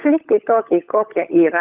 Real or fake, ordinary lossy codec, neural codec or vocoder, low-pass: fake; Opus, 24 kbps; codec, 16 kHz, 16 kbps, FunCodec, trained on LibriTTS, 50 frames a second; 3.6 kHz